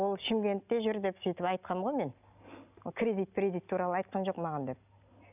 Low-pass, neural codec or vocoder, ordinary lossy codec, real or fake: 3.6 kHz; none; none; real